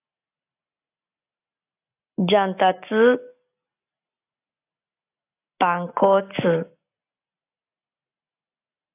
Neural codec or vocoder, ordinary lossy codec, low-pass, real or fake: none; AAC, 24 kbps; 3.6 kHz; real